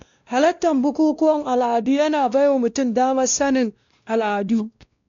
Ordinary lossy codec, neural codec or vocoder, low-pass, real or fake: MP3, 64 kbps; codec, 16 kHz, 1 kbps, X-Codec, WavLM features, trained on Multilingual LibriSpeech; 7.2 kHz; fake